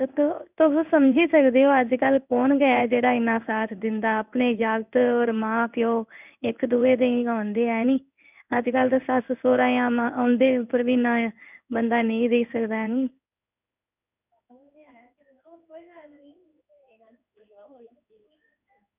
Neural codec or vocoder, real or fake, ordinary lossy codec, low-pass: codec, 16 kHz in and 24 kHz out, 1 kbps, XY-Tokenizer; fake; none; 3.6 kHz